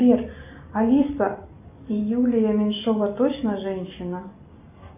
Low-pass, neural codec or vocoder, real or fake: 3.6 kHz; none; real